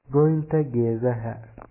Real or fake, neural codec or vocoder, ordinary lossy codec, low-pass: fake; codec, 44.1 kHz, 7.8 kbps, DAC; MP3, 16 kbps; 3.6 kHz